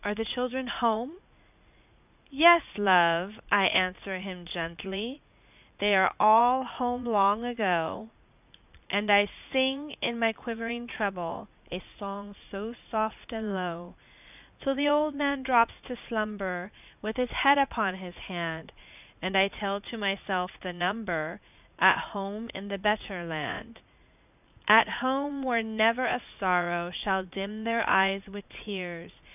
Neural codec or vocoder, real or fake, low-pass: vocoder, 44.1 kHz, 80 mel bands, Vocos; fake; 3.6 kHz